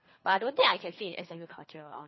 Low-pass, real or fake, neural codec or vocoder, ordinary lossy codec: 7.2 kHz; fake; codec, 24 kHz, 3 kbps, HILCodec; MP3, 24 kbps